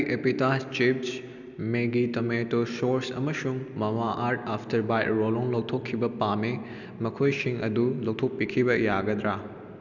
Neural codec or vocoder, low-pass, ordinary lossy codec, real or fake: none; 7.2 kHz; none; real